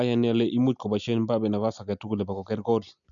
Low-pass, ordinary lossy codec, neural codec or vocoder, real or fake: 7.2 kHz; none; none; real